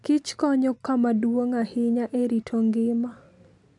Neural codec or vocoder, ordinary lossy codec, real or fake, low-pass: none; none; real; 10.8 kHz